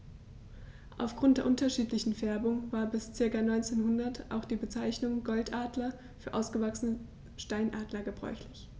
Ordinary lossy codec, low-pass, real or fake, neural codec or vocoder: none; none; real; none